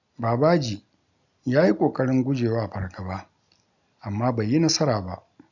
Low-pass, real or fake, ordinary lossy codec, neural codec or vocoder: 7.2 kHz; real; none; none